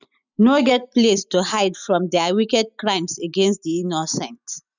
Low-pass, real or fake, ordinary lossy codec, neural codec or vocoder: 7.2 kHz; real; none; none